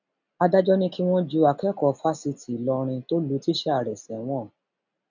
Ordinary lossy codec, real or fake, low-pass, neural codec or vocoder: none; real; 7.2 kHz; none